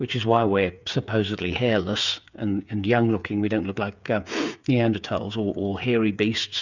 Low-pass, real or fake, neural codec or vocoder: 7.2 kHz; fake; codec, 16 kHz, 8 kbps, FreqCodec, smaller model